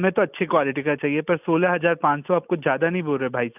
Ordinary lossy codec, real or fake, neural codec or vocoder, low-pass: none; real; none; 3.6 kHz